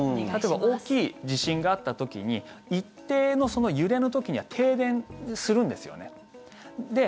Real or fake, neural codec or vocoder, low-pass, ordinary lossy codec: real; none; none; none